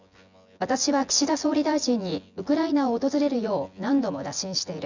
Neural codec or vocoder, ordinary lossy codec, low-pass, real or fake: vocoder, 24 kHz, 100 mel bands, Vocos; none; 7.2 kHz; fake